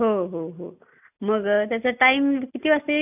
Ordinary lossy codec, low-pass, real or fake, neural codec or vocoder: none; 3.6 kHz; real; none